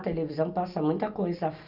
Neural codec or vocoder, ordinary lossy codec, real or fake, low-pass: none; none; real; 5.4 kHz